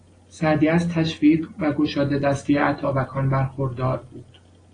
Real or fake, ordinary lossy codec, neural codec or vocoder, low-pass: real; AAC, 32 kbps; none; 9.9 kHz